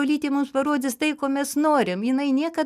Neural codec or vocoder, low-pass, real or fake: none; 14.4 kHz; real